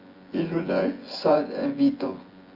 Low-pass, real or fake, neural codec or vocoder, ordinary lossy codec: 5.4 kHz; fake; vocoder, 24 kHz, 100 mel bands, Vocos; Opus, 32 kbps